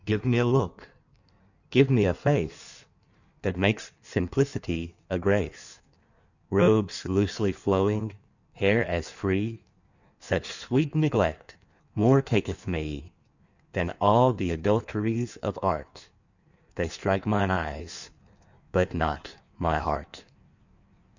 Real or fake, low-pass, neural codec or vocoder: fake; 7.2 kHz; codec, 16 kHz in and 24 kHz out, 1.1 kbps, FireRedTTS-2 codec